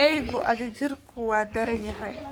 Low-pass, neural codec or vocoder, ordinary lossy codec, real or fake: none; codec, 44.1 kHz, 3.4 kbps, Pupu-Codec; none; fake